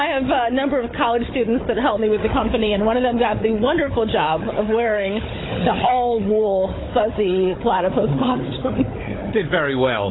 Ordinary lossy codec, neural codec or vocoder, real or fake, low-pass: AAC, 16 kbps; codec, 16 kHz, 16 kbps, FunCodec, trained on Chinese and English, 50 frames a second; fake; 7.2 kHz